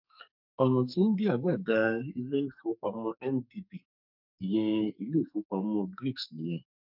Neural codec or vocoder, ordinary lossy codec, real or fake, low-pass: codec, 44.1 kHz, 2.6 kbps, SNAC; none; fake; 5.4 kHz